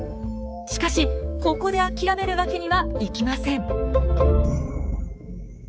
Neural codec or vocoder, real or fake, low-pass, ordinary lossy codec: codec, 16 kHz, 4 kbps, X-Codec, HuBERT features, trained on balanced general audio; fake; none; none